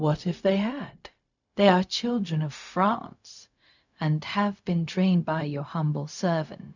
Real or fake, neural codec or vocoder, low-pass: fake; codec, 16 kHz, 0.4 kbps, LongCat-Audio-Codec; 7.2 kHz